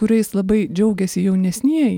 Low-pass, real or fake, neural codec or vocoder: 19.8 kHz; real; none